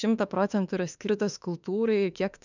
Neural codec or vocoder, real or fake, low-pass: autoencoder, 48 kHz, 32 numbers a frame, DAC-VAE, trained on Japanese speech; fake; 7.2 kHz